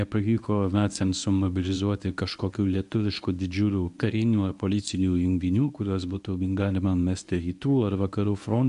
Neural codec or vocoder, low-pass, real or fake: codec, 24 kHz, 0.9 kbps, WavTokenizer, medium speech release version 1; 10.8 kHz; fake